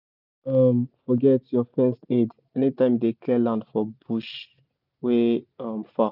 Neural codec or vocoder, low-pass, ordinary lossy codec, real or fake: none; 5.4 kHz; none; real